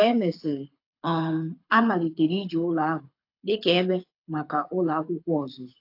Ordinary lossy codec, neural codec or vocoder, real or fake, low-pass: AAC, 32 kbps; codec, 24 kHz, 6 kbps, HILCodec; fake; 5.4 kHz